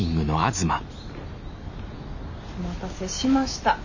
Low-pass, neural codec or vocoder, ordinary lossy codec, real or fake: 7.2 kHz; none; none; real